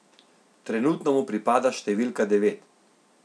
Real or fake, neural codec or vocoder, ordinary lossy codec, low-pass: real; none; none; none